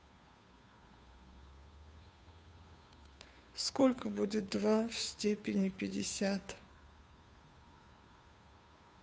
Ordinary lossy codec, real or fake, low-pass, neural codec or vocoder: none; fake; none; codec, 16 kHz, 2 kbps, FunCodec, trained on Chinese and English, 25 frames a second